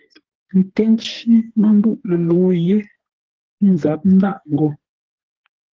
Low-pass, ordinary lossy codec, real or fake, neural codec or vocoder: 7.2 kHz; Opus, 16 kbps; fake; codec, 32 kHz, 1.9 kbps, SNAC